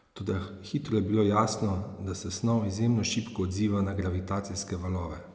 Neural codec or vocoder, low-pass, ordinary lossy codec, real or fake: none; none; none; real